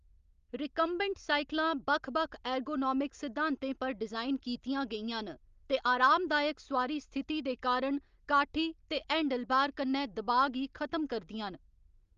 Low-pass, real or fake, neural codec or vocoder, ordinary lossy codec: 7.2 kHz; real; none; Opus, 32 kbps